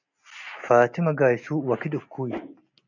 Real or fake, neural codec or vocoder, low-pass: real; none; 7.2 kHz